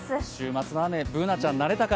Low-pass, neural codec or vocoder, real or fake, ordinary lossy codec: none; none; real; none